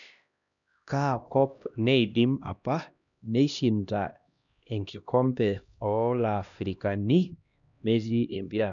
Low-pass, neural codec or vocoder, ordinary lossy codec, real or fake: 7.2 kHz; codec, 16 kHz, 1 kbps, X-Codec, HuBERT features, trained on LibriSpeech; none; fake